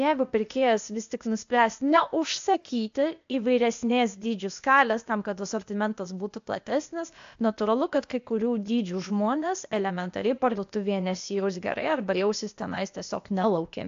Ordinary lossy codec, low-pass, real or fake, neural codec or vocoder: AAC, 64 kbps; 7.2 kHz; fake; codec, 16 kHz, 0.8 kbps, ZipCodec